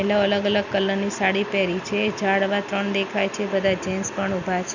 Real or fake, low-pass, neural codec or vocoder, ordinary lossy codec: real; 7.2 kHz; none; none